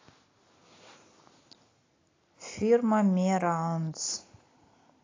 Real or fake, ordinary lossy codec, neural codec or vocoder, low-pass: real; AAC, 32 kbps; none; 7.2 kHz